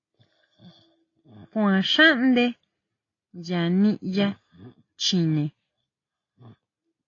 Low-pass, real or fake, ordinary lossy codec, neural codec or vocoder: 7.2 kHz; real; AAC, 32 kbps; none